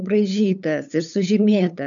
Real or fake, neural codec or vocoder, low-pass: fake; codec, 16 kHz, 8 kbps, FunCodec, trained on LibriTTS, 25 frames a second; 7.2 kHz